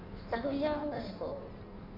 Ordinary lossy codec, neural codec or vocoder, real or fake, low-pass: none; codec, 16 kHz in and 24 kHz out, 1.1 kbps, FireRedTTS-2 codec; fake; 5.4 kHz